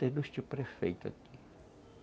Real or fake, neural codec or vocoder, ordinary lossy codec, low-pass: real; none; none; none